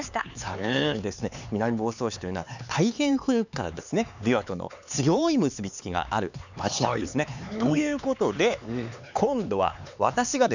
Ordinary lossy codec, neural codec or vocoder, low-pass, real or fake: none; codec, 16 kHz, 4 kbps, X-Codec, HuBERT features, trained on LibriSpeech; 7.2 kHz; fake